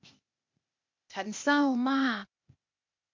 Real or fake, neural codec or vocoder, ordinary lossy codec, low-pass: fake; codec, 16 kHz, 0.8 kbps, ZipCodec; MP3, 48 kbps; 7.2 kHz